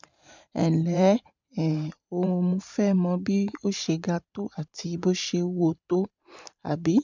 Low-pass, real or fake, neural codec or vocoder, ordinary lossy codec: 7.2 kHz; fake; vocoder, 44.1 kHz, 128 mel bands every 512 samples, BigVGAN v2; MP3, 64 kbps